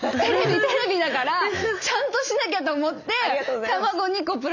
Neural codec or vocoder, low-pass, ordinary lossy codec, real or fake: none; 7.2 kHz; none; real